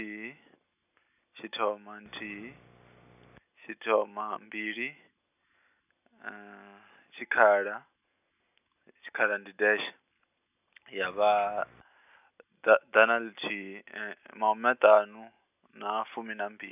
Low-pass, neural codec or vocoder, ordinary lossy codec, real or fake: 3.6 kHz; none; none; real